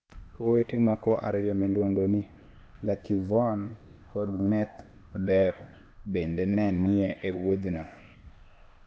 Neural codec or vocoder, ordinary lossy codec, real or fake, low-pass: codec, 16 kHz, 0.8 kbps, ZipCodec; none; fake; none